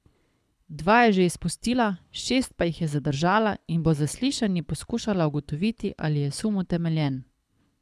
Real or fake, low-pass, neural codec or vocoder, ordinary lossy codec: fake; none; codec, 24 kHz, 6 kbps, HILCodec; none